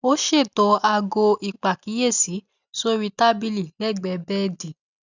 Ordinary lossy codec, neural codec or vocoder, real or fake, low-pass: none; none; real; 7.2 kHz